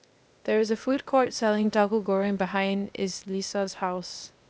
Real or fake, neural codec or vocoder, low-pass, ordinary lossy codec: fake; codec, 16 kHz, 0.8 kbps, ZipCodec; none; none